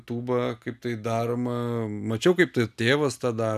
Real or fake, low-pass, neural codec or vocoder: real; 14.4 kHz; none